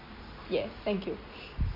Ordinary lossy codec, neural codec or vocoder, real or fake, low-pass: MP3, 24 kbps; none; real; 5.4 kHz